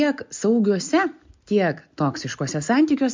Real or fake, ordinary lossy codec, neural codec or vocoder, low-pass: real; MP3, 64 kbps; none; 7.2 kHz